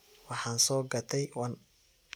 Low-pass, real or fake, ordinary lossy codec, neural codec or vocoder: none; real; none; none